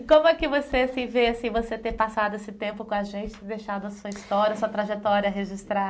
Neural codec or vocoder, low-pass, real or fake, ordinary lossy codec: none; none; real; none